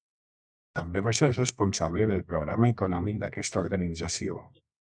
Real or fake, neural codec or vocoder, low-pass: fake; codec, 24 kHz, 0.9 kbps, WavTokenizer, medium music audio release; 9.9 kHz